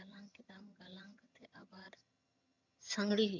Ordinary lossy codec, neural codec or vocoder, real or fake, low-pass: none; vocoder, 22.05 kHz, 80 mel bands, HiFi-GAN; fake; 7.2 kHz